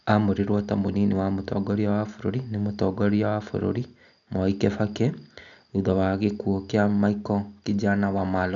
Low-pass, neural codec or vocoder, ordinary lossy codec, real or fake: 7.2 kHz; none; none; real